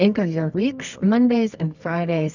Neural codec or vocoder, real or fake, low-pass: codec, 24 kHz, 0.9 kbps, WavTokenizer, medium music audio release; fake; 7.2 kHz